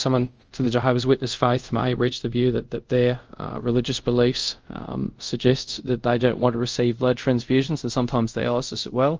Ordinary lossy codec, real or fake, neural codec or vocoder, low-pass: Opus, 24 kbps; fake; codec, 24 kHz, 0.5 kbps, DualCodec; 7.2 kHz